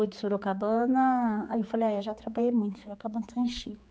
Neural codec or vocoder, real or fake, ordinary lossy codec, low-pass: codec, 16 kHz, 4 kbps, X-Codec, HuBERT features, trained on general audio; fake; none; none